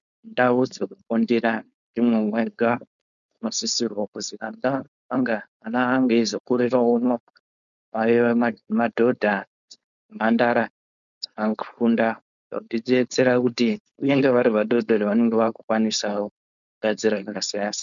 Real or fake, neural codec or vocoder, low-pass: fake; codec, 16 kHz, 4.8 kbps, FACodec; 7.2 kHz